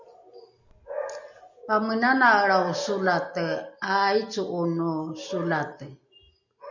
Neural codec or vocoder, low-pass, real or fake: none; 7.2 kHz; real